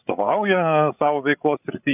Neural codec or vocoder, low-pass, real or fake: codec, 16 kHz, 16 kbps, FreqCodec, larger model; 3.6 kHz; fake